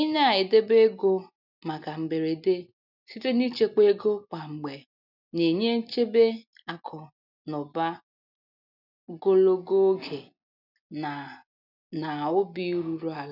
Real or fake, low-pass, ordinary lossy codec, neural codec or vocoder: real; 5.4 kHz; none; none